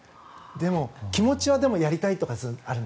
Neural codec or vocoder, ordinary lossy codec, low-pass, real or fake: none; none; none; real